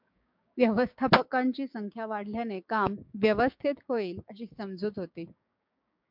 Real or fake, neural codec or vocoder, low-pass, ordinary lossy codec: fake; codec, 16 kHz, 6 kbps, DAC; 5.4 kHz; MP3, 48 kbps